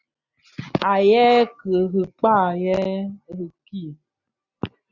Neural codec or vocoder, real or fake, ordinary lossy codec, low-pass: none; real; Opus, 64 kbps; 7.2 kHz